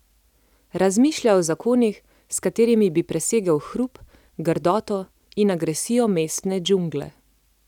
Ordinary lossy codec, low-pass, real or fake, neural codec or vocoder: none; 19.8 kHz; real; none